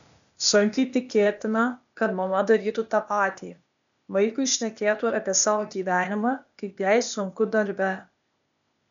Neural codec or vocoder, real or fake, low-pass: codec, 16 kHz, 0.8 kbps, ZipCodec; fake; 7.2 kHz